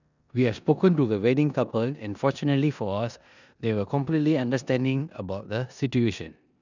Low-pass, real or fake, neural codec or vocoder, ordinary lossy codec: 7.2 kHz; fake; codec, 16 kHz in and 24 kHz out, 0.9 kbps, LongCat-Audio-Codec, four codebook decoder; none